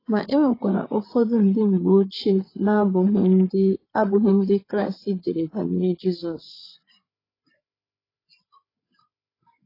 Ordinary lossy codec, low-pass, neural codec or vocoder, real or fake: AAC, 24 kbps; 5.4 kHz; codec, 16 kHz, 8 kbps, FreqCodec, larger model; fake